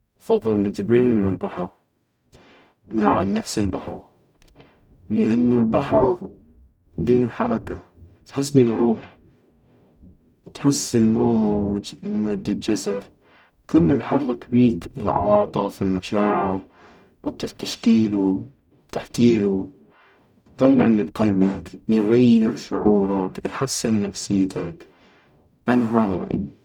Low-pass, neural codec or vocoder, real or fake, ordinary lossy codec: 19.8 kHz; codec, 44.1 kHz, 0.9 kbps, DAC; fake; none